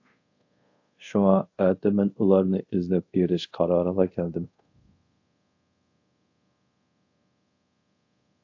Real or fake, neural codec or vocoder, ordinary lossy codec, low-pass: fake; codec, 24 kHz, 0.5 kbps, DualCodec; AAC, 48 kbps; 7.2 kHz